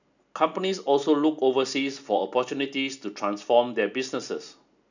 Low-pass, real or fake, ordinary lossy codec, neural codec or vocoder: 7.2 kHz; real; none; none